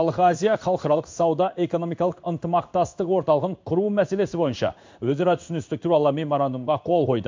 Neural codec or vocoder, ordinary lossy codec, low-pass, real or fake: codec, 16 kHz in and 24 kHz out, 1 kbps, XY-Tokenizer; MP3, 64 kbps; 7.2 kHz; fake